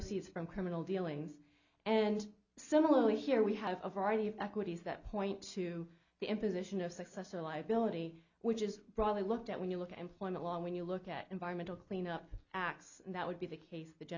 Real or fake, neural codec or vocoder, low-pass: real; none; 7.2 kHz